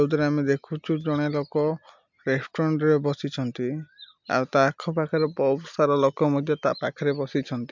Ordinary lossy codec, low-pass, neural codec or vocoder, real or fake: MP3, 64 kbps; 7.2 kHz; none; real